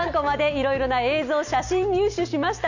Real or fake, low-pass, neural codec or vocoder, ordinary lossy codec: real; 7.2 kHz; none; none